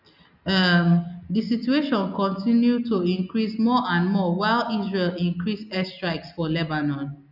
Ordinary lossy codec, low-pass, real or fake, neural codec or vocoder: none; 5.4 kHz; real; none